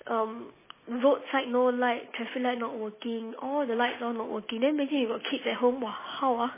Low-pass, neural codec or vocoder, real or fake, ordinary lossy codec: 3.6 kHz; none; real; MP3, 16 kbps